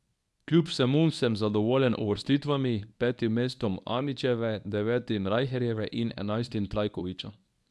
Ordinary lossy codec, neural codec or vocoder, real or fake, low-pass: none; codec, 24 kHz, 0.9 kbps, WavTokenizer, medium speech release version 1; fake; none